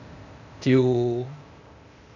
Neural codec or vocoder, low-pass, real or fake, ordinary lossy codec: codec, 16 kHz, 0.8 kbps, ZipCodec; 7.2 kHz; fake; none